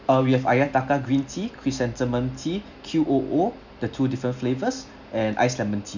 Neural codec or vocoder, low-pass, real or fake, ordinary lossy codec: none; 7.2 kHz; real; none